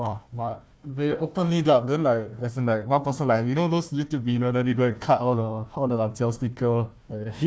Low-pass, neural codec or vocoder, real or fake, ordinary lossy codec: none; codec, 16 kHz, 1 kbps, FunCodec, trained on Chinese and English, 50 frames a second; fake; none